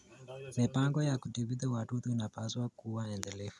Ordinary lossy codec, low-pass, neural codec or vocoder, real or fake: none; none; none; real